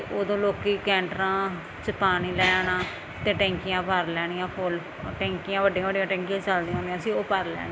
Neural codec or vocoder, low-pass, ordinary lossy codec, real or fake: none; none; none; real